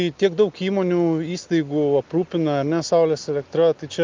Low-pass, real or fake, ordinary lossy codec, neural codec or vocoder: 7.2 kHz; real; Opus, 24 kbps; none